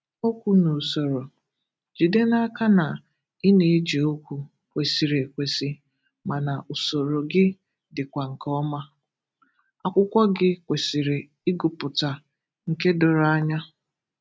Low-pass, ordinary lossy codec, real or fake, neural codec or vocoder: none; none; real; none